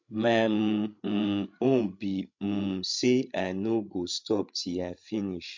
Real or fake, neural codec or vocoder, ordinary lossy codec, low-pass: fake; codec, 16 kHz, 8 kbps, FreqCodec, larger model; MP3, 48 kbps; 7.2 kHz